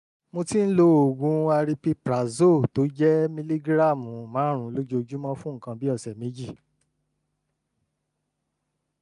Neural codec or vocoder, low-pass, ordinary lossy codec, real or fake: none; 10.8 kHz; none; real